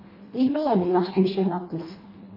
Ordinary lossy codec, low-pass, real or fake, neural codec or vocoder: MP3, 24 kbps; 5.4 kHz; fake; codec, 24 kHz, 1.5 kbps, HILCodec